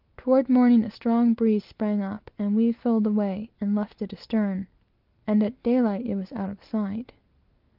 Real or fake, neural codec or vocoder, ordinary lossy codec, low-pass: real; none; Opus, 32 kbps; 5.4 kHz